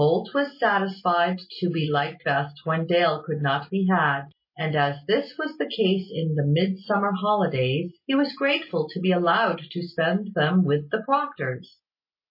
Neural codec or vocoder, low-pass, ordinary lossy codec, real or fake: none; 5.4 kHz; MP3, 24 kbps; real